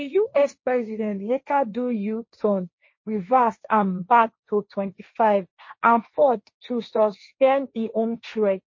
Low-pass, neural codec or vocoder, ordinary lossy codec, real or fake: 7.2 kHz; codec, 16 kHz, 1.1 kbps, Voila-Tokenizer; MP3, 32 kbps; fake